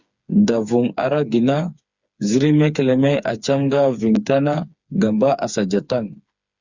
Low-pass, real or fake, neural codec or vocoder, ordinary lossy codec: 7.2 kHz; fake; codec, 16 kHz, 4 kbps, FreqCodec, smaller model; Opus, 64 kbps